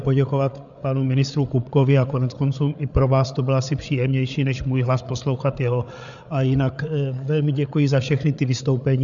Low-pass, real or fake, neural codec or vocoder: 7.2 kHz; fake; codec, 16 kHz, 8 kbps, FreqCodec, larger model